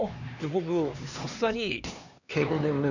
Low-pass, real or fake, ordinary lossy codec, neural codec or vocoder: 7.2 kHz; fake; none; codec, 16 kHz, 2 kbps, X-Codec, HuBERT features, trained on LibriSpeech